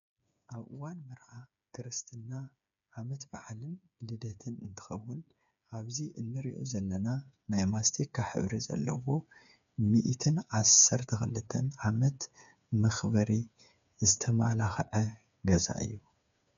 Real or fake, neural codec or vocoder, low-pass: fake; codec, 16 kHz, 8 kbps, FreqCodec, smaller model; 7.2 kHz